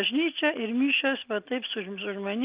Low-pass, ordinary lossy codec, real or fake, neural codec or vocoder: 3.6 kHz; Opus, 24 kbps; real; none